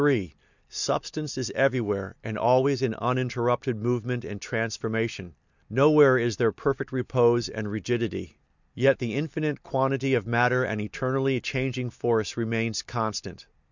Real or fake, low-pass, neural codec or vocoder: real; 7.2 kHz; none